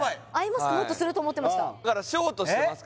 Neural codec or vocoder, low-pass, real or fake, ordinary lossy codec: none; none; real; none